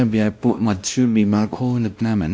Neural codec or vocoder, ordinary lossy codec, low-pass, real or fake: codec, 16 kHz, 1 kbps, X-Codec, WavLM features, trained on Multilingual LibriSpeech; none; none; fake